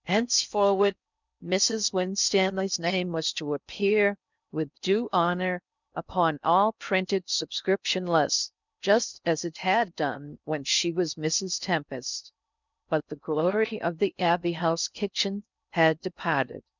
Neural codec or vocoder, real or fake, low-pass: codec, 16 kHz in and 24 kHz out, 0.6 kbps, FocalCodec, streaming, 4096 codes; fake; 7.2 kHz